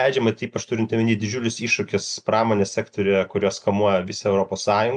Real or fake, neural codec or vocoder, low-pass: real; none; 9.9 kHz